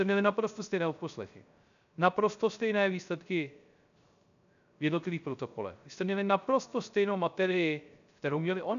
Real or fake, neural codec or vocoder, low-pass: fake; codec, 16 kHz, 0.3 kbps, FocalCodec; 7.2 kHz